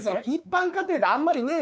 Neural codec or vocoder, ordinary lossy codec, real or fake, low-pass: codec, 16 kHz, 4 kbps, X-Codec, WavLM features, trained on Multilingual LibriSpeech; none; fake; none